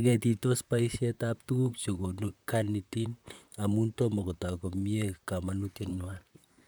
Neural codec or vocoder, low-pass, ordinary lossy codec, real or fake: vocoder, 44.1 kHz, 128 mel bands, Pupu-Vocoder; none; none; fake